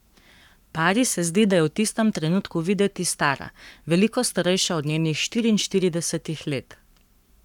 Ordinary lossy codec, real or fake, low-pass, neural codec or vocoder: none; fake; 19.8 kHz; codec, 44.1 kHz, 7.8 kbps, Pupu-Codec